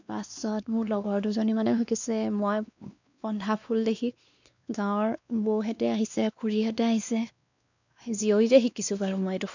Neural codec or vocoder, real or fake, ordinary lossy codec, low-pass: codec, 16 kHz, 2 kbps, X-Codec, WavLM features, trained on Multilingual LibriSpeech; fake; none; 7.2 kHz